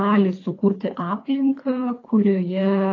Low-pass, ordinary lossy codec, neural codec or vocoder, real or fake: 7.2 kHz; AAC, 32 kbps; codec, 24 kHz, 3 kbps, HILCodec; fake